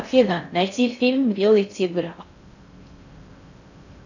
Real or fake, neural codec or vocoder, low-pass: fake; codec, 16 kHz in and 24 kHz out, 0.6 kbps, FocalCodec, streaming, 4096 codes; 7.2 kHz